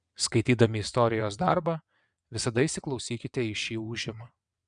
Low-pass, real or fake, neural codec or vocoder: 9.9 kHz; fake; vocoder, 22.05 kHz, 80 mel bands, WaveNeXt